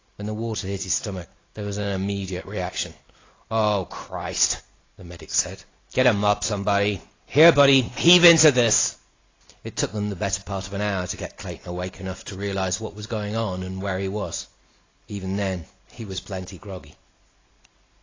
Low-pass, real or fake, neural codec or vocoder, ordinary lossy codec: 7.2 kHz; real; none; AAC, 32 kbps